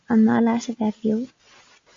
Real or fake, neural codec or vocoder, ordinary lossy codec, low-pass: real; none; MP3, 48 kbps; 7.2 kHz